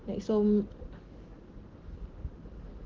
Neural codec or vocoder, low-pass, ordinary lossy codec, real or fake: none; 7.2 kHz; Opus, 16 kbps; real